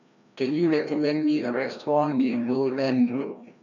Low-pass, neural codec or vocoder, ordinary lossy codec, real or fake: 7.2 kHz; codec, 16 kHz, 1 kbps, FreqCodec, larger model; none; fake